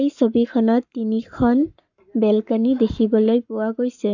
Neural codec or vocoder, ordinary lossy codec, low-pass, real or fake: codec, 44.1 kHz, 7.8 kbps, Pupu-Codec; none; 7.2 kHz; fake